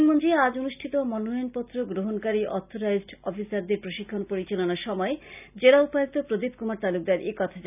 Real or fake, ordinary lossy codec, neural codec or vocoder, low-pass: real; none; none; 3.6 kHz